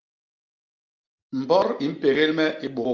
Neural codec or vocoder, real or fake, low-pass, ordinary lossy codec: none; real; 7.2 kHz; Opus, 32 kbps